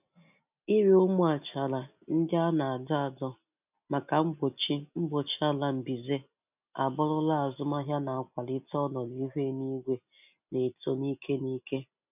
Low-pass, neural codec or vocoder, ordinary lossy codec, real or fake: 3.6 kHz; none; none; real